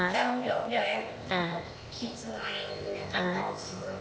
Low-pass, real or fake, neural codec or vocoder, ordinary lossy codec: none; fake; codec, 16 kHz, 0.8 kbps, ZipCodec; none